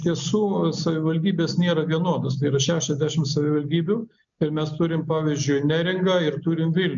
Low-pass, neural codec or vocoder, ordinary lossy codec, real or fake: 7.2 kHz; none; MP3, 64 kbps; real